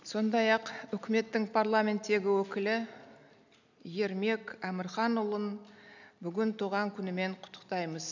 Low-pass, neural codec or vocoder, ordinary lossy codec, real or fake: 7.2 kHz; none; none; real